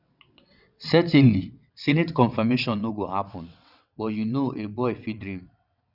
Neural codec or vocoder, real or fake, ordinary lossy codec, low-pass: vocoder, 22.05 kHz, 80 mel bands, WaveNeXt; fake; none; 5.4 kHz